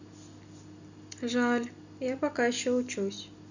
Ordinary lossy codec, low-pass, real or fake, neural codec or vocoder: none; 7.2 kHz; real; none